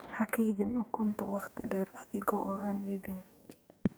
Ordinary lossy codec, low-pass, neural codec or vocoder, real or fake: none; none; codec, 44.1 kHz, 2.6 kbps, DAC; fake